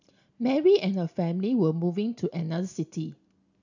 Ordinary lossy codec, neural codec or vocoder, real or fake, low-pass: none; none; real; 7.2 kHz